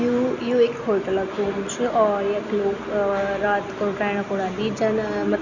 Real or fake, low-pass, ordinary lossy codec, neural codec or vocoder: real; 7.2 kHz; none; none